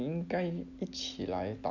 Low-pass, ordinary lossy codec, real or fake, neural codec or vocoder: 7.2 kHz; none; real; none